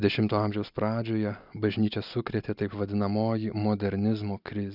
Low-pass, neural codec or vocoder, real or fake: 5.4 kHz; none; real